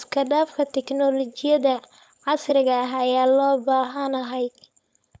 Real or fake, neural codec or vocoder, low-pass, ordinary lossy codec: fake; codec, 16 kHz, 4.8 kbps, FACodec; none; none